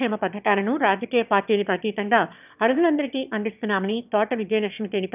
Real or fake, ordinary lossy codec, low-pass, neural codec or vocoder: fake; none; 3.6 kHz; autoencoder, 22.05 kHz, a latent of 192 numbers a frame, VITS, trained on one speaker